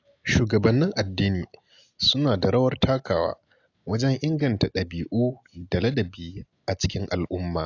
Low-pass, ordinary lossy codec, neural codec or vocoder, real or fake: 7.2 kHz; AAC, 48 kbps; none; real